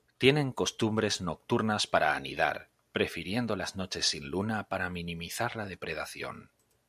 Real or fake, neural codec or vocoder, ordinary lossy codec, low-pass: real; none; AAC, 96 kbps; 14.4 kHz